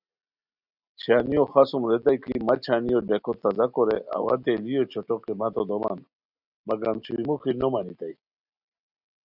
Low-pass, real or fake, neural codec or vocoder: 5.4 kHz; real; none